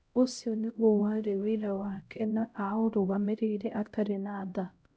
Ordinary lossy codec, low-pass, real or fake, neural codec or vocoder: none; none; fake; codec, 16 kHz, 0.5 kbps, X-Codec, HuBERT features, trained on LibriSpeech